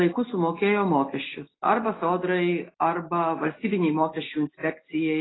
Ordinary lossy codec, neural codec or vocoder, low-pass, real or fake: AAC, 16 kbps; none; 7.2 kHz; real